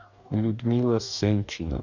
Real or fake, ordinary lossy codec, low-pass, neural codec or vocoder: fake; none; 7.2 kHz; codec, 44.1 kHz, 2.6 kbps, DAC